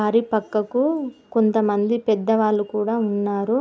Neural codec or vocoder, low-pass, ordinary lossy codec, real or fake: none; none; none; real